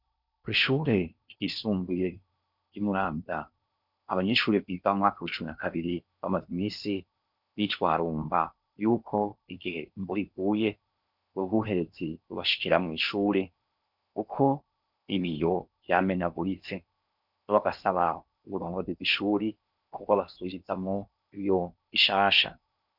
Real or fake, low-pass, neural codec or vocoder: fake; 5.4 kHz; codec, 16 kHz in and 24 kHz out, 0.8 kbps, FocalCodec, streaming, 65536 codes